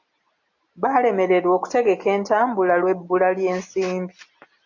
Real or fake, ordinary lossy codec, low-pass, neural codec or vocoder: real; AAC, 48 kbps; 7.2 kHz; none